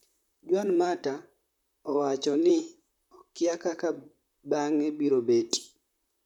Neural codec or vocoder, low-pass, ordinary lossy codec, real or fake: vocoder, 44.1 kHz, 128 mel bands, Pupu-Vocoder; 19.8 kHz; none; fake